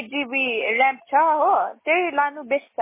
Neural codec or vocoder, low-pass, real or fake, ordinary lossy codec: none; 3.6 kHz; real; MP3, 16 kbps